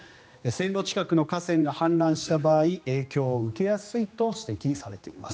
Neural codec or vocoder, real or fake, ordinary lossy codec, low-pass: codec, 16 kHz, 2 kbps, X-Codec, HuBERT features, trained on general audio; fake; none; none